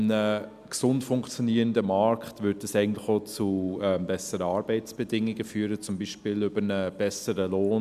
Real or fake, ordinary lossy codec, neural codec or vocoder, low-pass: real; none; none; 14.4 kHz